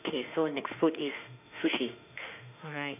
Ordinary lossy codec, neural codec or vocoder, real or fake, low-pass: none; autoencoder, 48 kHz, 32 numbers a frame, DAC-VAE, trained on Japanese speech; fake; 3.6 kHz